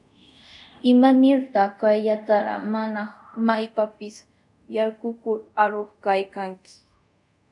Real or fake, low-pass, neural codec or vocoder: fake; 10.8 kHz; codec, 24 kHz, 0.5 kbps, DualCodec